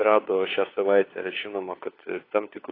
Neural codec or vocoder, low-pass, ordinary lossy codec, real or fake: codec, 16 kHz, 4 kbps, FunCodec, trained on LibriTTS, 50 frames a second; 5.4 kHz; AAC, 24 kbps; fake